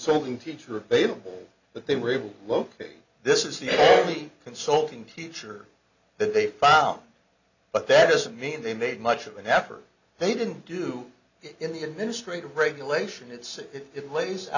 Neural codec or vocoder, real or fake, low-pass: none; real; 7.2 kHz